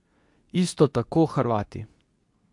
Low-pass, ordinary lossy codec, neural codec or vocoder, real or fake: 10.8 kHz; AAC, 64 kbps; codec, 24 kHz, 0.9 kbps, WavTokenizer, medium speech release version 2; fake